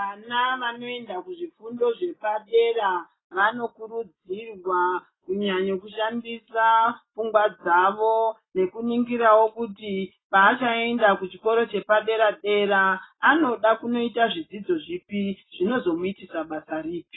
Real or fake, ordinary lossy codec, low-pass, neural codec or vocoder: real; AAC, 16 kbps; 7.2 kHz; none